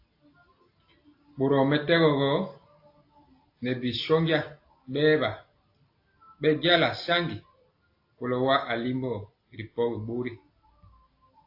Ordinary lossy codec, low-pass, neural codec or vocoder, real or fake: AAC, 32 kbps; 5.4 kHz; none; real